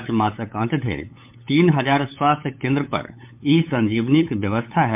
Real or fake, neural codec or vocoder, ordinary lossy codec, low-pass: fake; codec, 16 kHz, 8 kbps, FunCodec, trained on LibriTTS, 25 frames a second; MP3, 32 kbps; 3.6 kHz